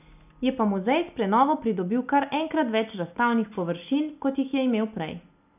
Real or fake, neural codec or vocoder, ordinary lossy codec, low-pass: real; none; none; 3.6 kHz